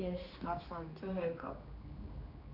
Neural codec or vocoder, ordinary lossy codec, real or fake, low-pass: codec, 16 kHz, 2 kbps, X-Codec, HuBERT features, trained on general audio; none; fake; 5.4 kHz